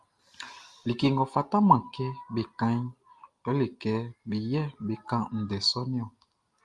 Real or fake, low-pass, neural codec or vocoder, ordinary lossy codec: real; 10.8 kHz; none; Opus, 32 kbps